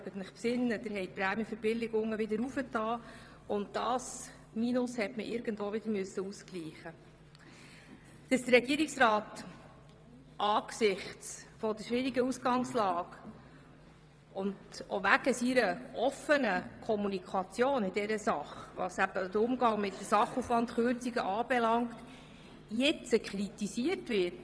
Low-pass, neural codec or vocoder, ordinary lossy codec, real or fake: none; vocoder, 22.05 kHz, 80 mel bands, WaveNeXt; none; fake